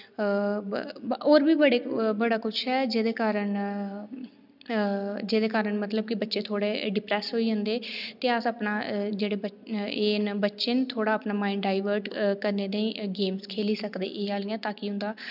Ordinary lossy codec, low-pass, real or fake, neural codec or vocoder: none; 5.4 kHz; real; none